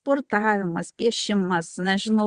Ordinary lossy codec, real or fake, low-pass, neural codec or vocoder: Opus, 64 kbps; fake; 9.9 kHz; vocoder, 22.05 kHz, 80 mel bands, WaveNeXt